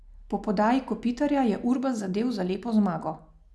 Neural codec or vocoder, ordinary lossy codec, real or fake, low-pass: none; none; real; none